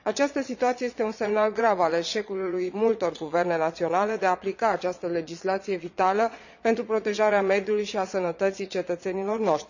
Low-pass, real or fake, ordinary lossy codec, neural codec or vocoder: 7.2 kHz; fake; none; vocoder, 22.05 kHz, 80 mel bands, Vocos